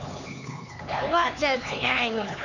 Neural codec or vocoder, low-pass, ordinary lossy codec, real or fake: codec, 16 kHz, 4 kbps, X-Codec, HuBERT features, trained on LibriSpeech; 7.2 kHz; none; fake